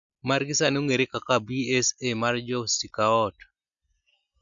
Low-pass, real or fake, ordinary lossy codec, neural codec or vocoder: 7.2 kHz; real; none; none